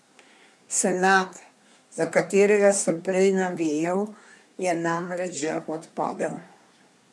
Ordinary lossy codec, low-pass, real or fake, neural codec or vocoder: none; none; fake; codec, 24 kHz, 1 kbps, SNAC